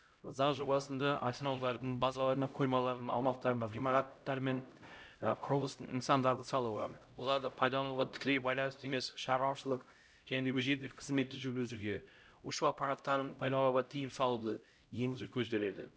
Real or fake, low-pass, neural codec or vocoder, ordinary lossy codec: fake; none; codec, 16 kHz, 0.5 kbps, X-Codec, HuBERT features, trained on LibriSpeech; none